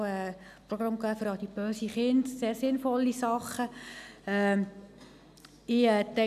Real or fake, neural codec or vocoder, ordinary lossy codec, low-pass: real; none; none; 14.4 kHz